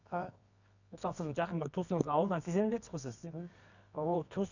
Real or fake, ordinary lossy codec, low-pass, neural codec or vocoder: fake; none; 7.2 kHz; codec, 24 kHz, 0.9 kbps, WavTokenizer, medium music audio release